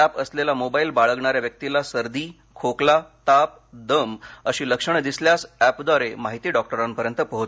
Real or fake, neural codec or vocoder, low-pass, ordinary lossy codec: real; none; none; none